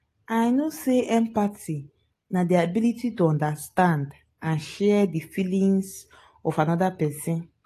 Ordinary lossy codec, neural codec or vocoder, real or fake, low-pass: AAC, 48 kbps; none; real; 14.4 kHz